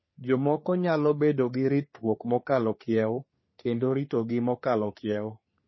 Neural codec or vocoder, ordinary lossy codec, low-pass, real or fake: codec, 44.1 kHz, 3.4 kbps, Pupu-Codec; MP3, 24 kbps; 7.2 kHz; fake